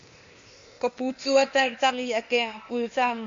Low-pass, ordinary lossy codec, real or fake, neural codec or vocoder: 7.2 kHz; MP3, 48 kbps; fake; codec, 16 kHz, 0.8 kbps, ZipCodec